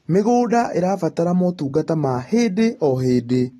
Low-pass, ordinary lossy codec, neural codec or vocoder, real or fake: 19.8 kHz; AAC, 32 kbps; none; real